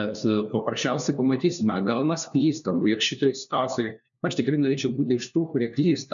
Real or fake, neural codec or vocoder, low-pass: fake; codec, 16 kHz, 1 kbps, FunCodec, trained on LibriTTS, 50 frames a second; 7.2 kHz